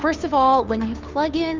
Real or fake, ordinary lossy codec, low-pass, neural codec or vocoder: fake; Opus, 32 kbps; 7.2 kHz; codec, 16 kHz, 2 kbps, FunCodec, trained on Chinese and English, 25 frames a second